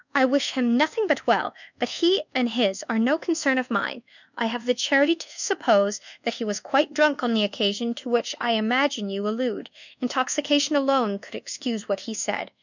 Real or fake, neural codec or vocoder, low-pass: fake; codec, 24 kHz, 1.2 kbps, DualCodec; 7.2 kHz